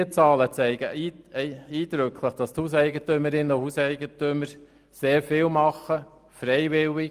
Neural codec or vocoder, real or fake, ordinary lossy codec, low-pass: none; real; Opus, 32 kbps; 14.4 kHz